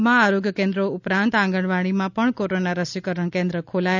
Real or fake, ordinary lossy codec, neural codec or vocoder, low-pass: real; none; none; 7.2 kHz